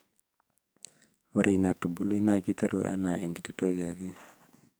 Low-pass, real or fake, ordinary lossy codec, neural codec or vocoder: none; fake; none; codec, 44.1 kHz, 2.6 kbps, SNAC